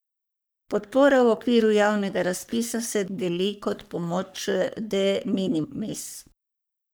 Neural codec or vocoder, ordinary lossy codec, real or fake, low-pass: codec, 44.1 kHz, 3.4 kbps, Pupu-Codec; none; fake; none